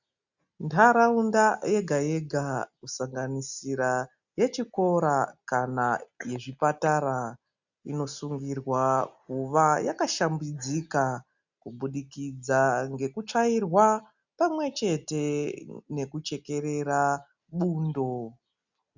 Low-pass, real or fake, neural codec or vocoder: 7.2 kHz; real; none